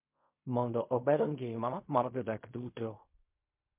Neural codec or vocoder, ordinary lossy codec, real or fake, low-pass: codec, 16 kHz in and 24 kHz out, 0.4 kbps, LongCat-Audio-Codec, fine tuned four codebook decoder; MP3, 32 kbps; fake; 3.6 kHz